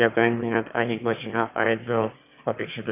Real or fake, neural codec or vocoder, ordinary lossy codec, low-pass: fake; autoencoder, 22.05 kHz, a latent of 192 numbers a frame, VITS, trained on one speaker; none; 3.6 kHz